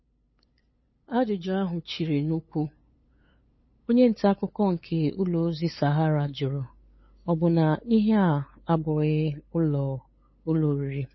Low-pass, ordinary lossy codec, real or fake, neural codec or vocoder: 7.2 kHz; MP3, 24 kbps; fake; codec, 16 kHz, 8 kbps, FunCodec, trained on LibriTTS, 25 frames a second